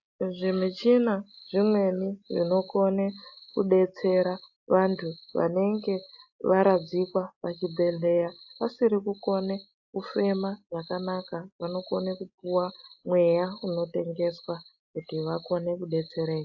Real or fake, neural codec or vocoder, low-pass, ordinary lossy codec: real; none; 7.2 kHz; AAC, 48 kbps